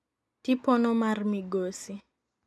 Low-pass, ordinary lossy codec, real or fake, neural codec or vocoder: none; none; real; none